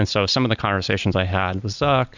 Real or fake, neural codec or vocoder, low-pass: real; none; 7.2 kHz